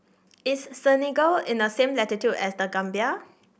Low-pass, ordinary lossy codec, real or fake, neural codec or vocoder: none; none; real; none